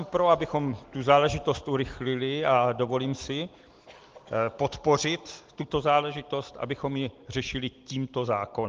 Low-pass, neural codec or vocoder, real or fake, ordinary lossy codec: 7.2 kHz; none; real; Opus, 24 kbps